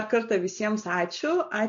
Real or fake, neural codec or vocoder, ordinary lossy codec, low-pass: real; none; MP3, 48 kbps; 7.2 kHz